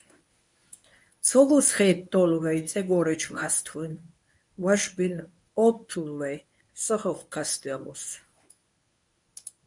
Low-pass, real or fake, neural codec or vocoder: 10.8 kHz; fake; codec, 24 kHz, 0.9 kbps, WavTokenizer, medium speech release version 1